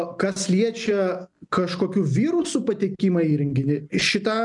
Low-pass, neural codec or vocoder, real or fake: 10.8 kHz; none; real